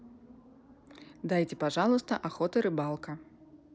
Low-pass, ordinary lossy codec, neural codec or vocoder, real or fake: none; none; none; real